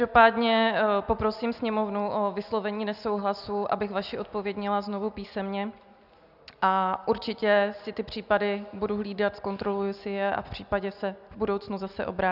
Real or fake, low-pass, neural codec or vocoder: real; 5.4 kHz; none